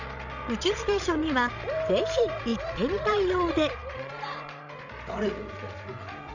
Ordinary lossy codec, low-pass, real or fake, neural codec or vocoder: none; 7.2 kHz; fake; codec, 16 kHz, 16 kbps, FreqCodec, larger model